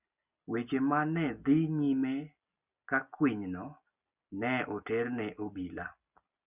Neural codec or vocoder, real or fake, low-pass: none; real; 3.6 kHz